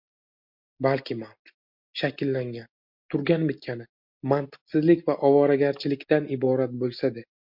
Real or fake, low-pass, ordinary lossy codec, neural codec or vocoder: real; 5.4 kHz; MP3, 48 kbps; none